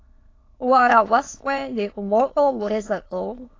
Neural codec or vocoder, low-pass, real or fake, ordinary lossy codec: autoencoder, 22.05 kHz, a latent of 192 numbers a frame, VITS, trained on many speakers; 7.2 kHz; fake; AAC, 32 kbps